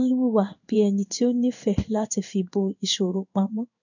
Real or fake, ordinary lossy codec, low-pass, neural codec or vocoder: fake; none; 7.2 kHz; codec, 16 kHz in and 24 kHz out, 1 kbps, XY-Tokenizer